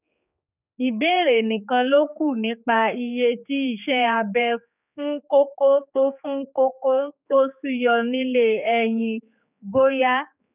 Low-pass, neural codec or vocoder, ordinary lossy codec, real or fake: 3.6 kHz; codec, 16 kHz, 4 kbps, X-Codec, HuBERT features, trained on general audio; none; fake